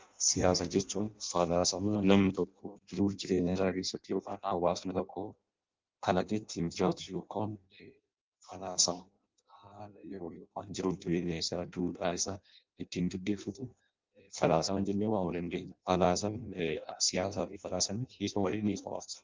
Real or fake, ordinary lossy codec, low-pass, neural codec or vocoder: fake; Opus, 32 kbps; 7.2 kHz; codec, 16 kHz in and 24 kHz out, 0.6 kbps, FireRedTTS-2 codec